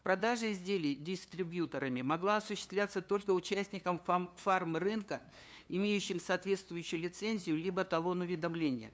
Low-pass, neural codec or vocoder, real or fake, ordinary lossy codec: none; codec, 16 kHz, 2 kbps, FunCodec, trained on LibriTTS, 25 frames a second; fake; none